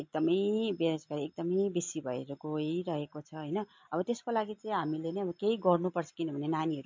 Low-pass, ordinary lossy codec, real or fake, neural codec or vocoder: 7.2 kHz; MP3, 48 kbps; real; none